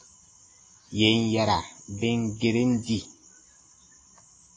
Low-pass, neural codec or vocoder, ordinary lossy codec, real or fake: 9.9 kHz; none; AAC, 32 kbps; real